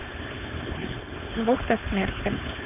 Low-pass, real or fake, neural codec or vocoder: 3.6 kHz; fake; codec, 16 kHz, 4.8 kbps, FACodec